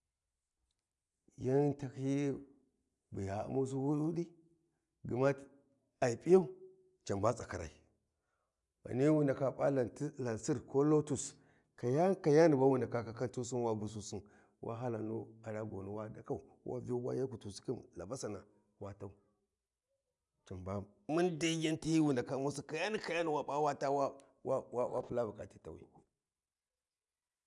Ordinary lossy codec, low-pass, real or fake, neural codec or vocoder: none; 10.8 kHz; real; none